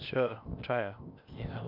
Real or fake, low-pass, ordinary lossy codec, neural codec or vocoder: fake; 5.4 kHz; none; codec, 16 kHz, 0.8 kbps, ZipCodec